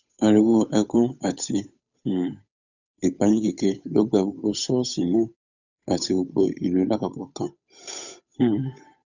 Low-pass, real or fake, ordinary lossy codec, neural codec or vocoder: 7.2 kHz; fake; none; codec, 16 kHz, 8 kbps, FunCodec, trained on Chinese and English, 25 frames a second